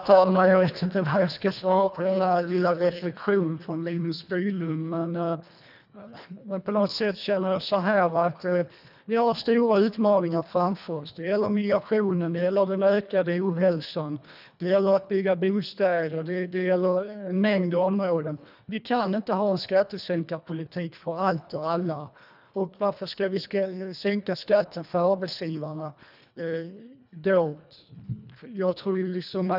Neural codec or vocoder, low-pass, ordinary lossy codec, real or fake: codec, 24 kHz, 1.5 kbps, HILCodec; 5.4 kHz; none; fake